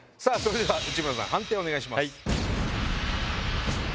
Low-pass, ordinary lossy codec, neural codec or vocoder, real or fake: none; none; none; real